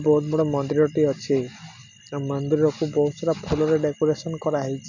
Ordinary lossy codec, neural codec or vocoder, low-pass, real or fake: none; none; 7.2 kHz; real